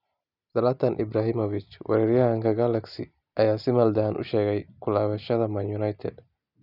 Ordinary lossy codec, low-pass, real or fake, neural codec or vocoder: none; 5.4 kHz; real; none